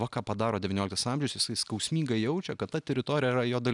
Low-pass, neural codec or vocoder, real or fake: 10.8 kHz; none; real